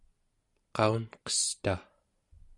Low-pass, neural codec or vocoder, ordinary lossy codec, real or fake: 10.8 kHz; none; Opus, 64 kbps; real